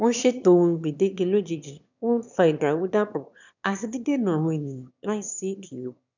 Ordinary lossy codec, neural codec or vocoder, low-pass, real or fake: none; autoencoder, 22.05 kHz, a latent of 192 numbers a frame, VITS, trained on one speaker; 7.2 kHz; fake